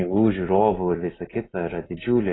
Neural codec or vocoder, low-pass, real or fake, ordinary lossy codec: none; 7.2 kHz; real; AAC, 16 kbps